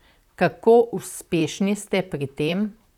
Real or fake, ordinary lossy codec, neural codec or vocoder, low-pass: fake; none; vocoder, 44.1 kHz, 128 mel bands, Pupu-Vocoder; 19.8 kHz